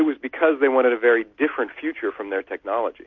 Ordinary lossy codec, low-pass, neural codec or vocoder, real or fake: MP3, 48 kbps; 7.2 kHz; none; real